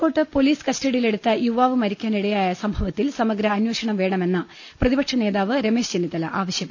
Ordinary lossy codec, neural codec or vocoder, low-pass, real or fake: none; none; none; real